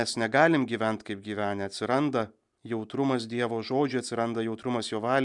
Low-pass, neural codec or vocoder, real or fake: 10.8 kHz; none; real